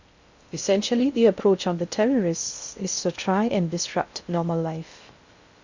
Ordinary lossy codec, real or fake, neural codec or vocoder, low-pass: Opus, 64 kbps; fake; codec, 16 kHz in and 24 kHz out, 0.6 kbps, FocalCodec, streaming, 2048 codes; 7.2 kHz